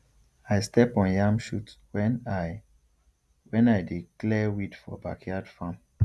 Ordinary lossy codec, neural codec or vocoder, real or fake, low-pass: none; none; real; none